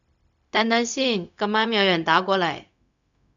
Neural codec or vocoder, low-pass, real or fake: codec, 16 kHz, 0.4 kbps, LongCat-Audio-Codec; 7.2 kHz; fake